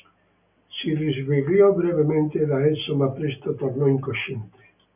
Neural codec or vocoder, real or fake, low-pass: none; real; 3.6 kHz